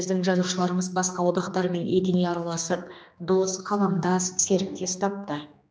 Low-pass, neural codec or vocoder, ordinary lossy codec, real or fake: none; codec, 16 kHz, 2 kbps, X-Codec, HuBERT features, trained on general audio; none; fake